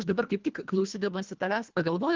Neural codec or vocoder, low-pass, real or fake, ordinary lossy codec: codec, 24 kHz, 1.5 kbps, HILCodec; 7.2 kHz; fake; Opus, 24 kbps